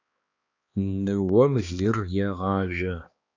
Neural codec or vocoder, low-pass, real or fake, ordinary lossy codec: codec, 16 kHz, 2 kbps, X-Codec, HuBERT features, trained on balanced general audio; 7.2 kHz; fake; AAC, 48 kbps